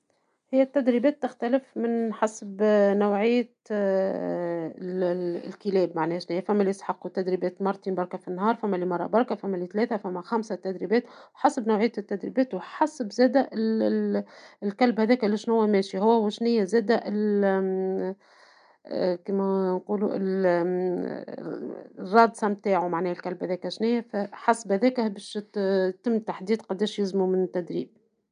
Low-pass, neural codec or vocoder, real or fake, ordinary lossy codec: 9.9 kHz; none; real; none